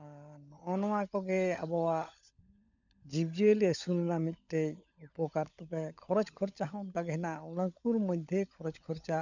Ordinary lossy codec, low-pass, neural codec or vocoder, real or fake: none; 7.2 kHz; codec, 16 kHz, 16 kbps, FunCodec, trained on LibriTTS, 50 frames a second; fake